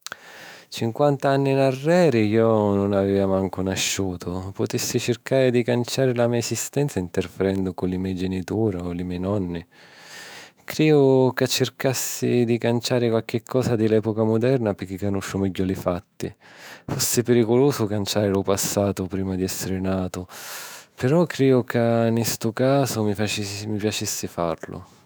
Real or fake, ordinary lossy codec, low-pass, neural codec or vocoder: fake; none; none; autoencoder, 48 kHz, 128 numbers a frame, DAC-VAE, trained on Japanese speech